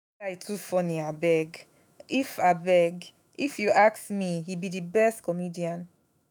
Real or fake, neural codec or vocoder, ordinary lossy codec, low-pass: fake; autoencoder, 48 kHz, 128 numbers a frame, DAC-VAE, trained on Japanese speech; none; none